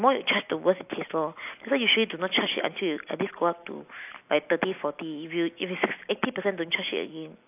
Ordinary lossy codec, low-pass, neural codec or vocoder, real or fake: AAC, 32 kbps; 3.6 kHz; none; real